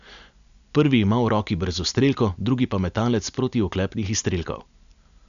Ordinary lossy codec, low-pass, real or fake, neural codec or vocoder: none; 7.2 kHz; real; none